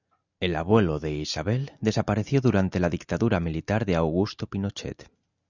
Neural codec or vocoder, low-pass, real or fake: none; 7.2 kHz; real